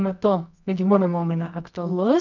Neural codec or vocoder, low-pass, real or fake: codec, 24 kHz, 0.9 kbps, WavTokenizer, medium music audio release; 7.2 kHz; fake